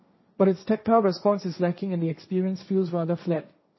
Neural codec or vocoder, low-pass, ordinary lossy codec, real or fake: codec, 16 kHz, 1.1 kbps, Voila-Tokenizer; 7.2 kHz; MP3, 24 kbps; fake